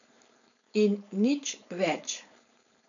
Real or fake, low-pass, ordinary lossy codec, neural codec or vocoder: fake; 7.2 kHz; none; codec, 16 kHz, 4.8 kbps, FACodec